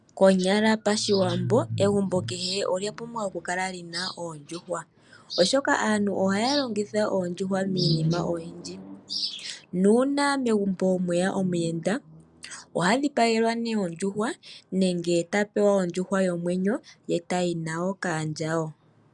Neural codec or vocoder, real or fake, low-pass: none; real; 10.8 kHz